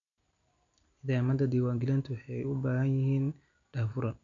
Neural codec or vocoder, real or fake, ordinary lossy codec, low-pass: none; real; none; 7.2 kHz